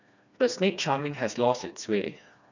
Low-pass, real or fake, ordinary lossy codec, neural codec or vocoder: 7.2 kHz; fake; none; codec, 16 kHz, 2 kbps, FreqCodec, smaller model